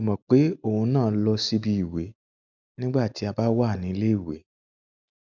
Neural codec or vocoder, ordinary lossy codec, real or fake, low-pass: none; none; real; 7.2 kHz